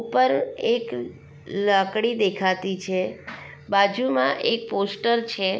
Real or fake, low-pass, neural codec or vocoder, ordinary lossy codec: real; none; none; none